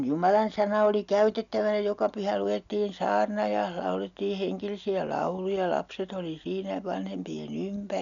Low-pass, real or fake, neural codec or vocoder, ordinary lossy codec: 7.2 kHz; real; none; none